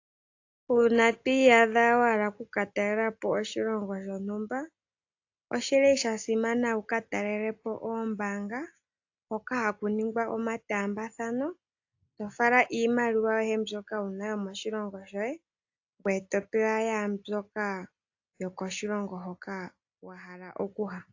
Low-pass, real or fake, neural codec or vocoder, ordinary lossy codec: 7.2 kHz; real; none; AAC, 48 kbps